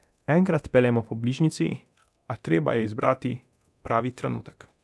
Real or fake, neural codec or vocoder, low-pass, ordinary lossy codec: fake; codec, 24 kHz, 0.9 kbps, DualCodec; none; none